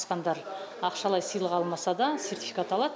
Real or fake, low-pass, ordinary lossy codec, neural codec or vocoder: real; none; none; none